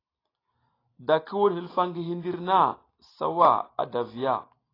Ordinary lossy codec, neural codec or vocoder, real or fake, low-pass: AAC, 24 kbps; none; real; 5.4 kHz